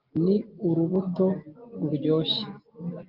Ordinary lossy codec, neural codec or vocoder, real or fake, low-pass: Opus, 32 kbps; none; real; 5.4 kHz